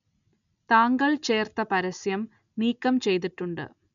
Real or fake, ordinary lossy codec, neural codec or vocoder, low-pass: real; none; none; 7.2 kHz